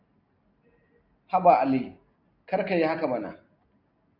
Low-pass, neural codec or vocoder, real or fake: 5.4 kHz; none; real